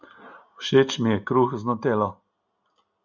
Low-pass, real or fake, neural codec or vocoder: 7.2 kHz; real; none